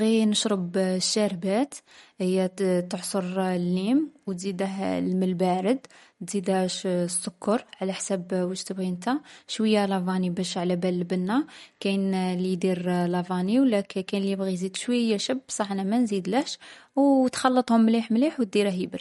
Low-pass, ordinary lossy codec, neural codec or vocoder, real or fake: 19.8 kHz; MP3, 48 kbps; none; real